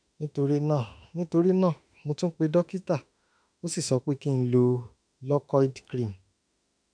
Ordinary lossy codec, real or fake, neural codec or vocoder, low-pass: none; fake; autoencoder, 48 kHz, 32 numbers a frame, DAC-VAE, trained on Japanese speech; 9.9 kHz